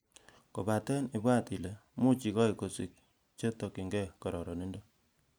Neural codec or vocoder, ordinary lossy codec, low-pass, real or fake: none; none; none; real